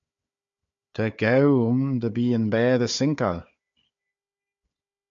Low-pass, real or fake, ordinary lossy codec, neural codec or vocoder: 7.2 kHz; fake; MP3, 64 kbps; codec, 16 kHz, 4 kbps, FunCodec, trained on Chinese and English, 50 frames a second